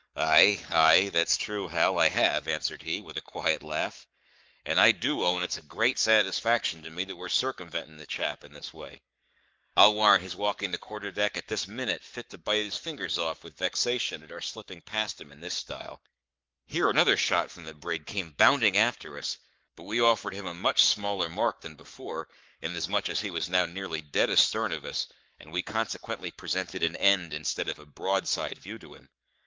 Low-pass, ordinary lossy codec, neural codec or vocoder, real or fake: 7.2 kHz; Opus, 32 kbps; codec, 44.1 kHz, 7.8 kbps, Pupu-Codec; fake